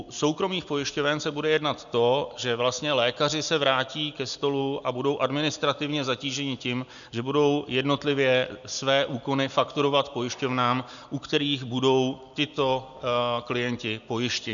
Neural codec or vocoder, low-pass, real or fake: none; 7.2 kHz; real